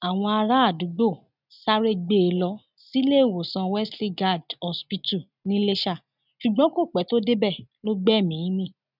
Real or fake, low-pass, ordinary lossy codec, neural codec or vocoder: real; 5.4 kHz; none; none